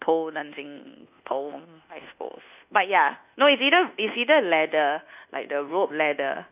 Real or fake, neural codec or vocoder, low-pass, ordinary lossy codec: fake; codec, 24 kHz, 1.2 kbps, DualCodec; 3.6 kHz; none